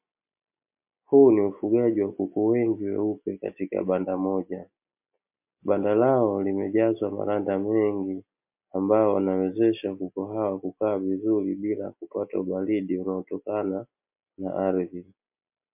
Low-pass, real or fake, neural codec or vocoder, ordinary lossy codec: 3.6 kHz; real; none; MP3, 32 kbps